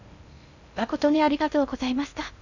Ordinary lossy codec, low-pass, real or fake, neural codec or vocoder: none; 7.2 kHz; fake; codec, 16 kHz in and 24 kHz out, 0.6 kbps, FocalCodec, streaming, 2048 codes